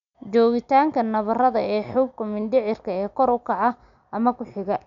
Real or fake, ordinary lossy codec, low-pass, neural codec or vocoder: real; none; 7.2 kHz; none